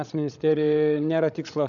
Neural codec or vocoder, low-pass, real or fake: codec, 16 kHz, 16 kbps, FreqCodec, larger model; 7.2 kHz; fake